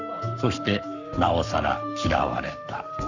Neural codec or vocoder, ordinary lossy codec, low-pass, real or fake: codec, 44.1 kHz, 7.8 kbps, Pupu-Codec; none; 7.2 kHz; fake